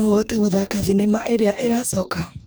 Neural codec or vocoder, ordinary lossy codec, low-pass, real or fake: codec, 44.1 kHz, 2.6 kbps, DAC; none; none; fake